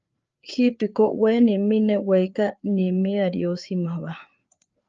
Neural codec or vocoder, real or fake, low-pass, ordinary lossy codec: codec, 16 kHz, 4 kbps, FreqCodec, larger model; fake; 7.2 kHz; Opus, 24 kbps